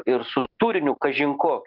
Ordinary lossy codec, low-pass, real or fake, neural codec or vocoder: Opus, 32 kbps; 5.4 kHz; real; none